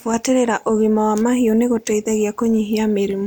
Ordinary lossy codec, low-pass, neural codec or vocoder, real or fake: none; none; none; real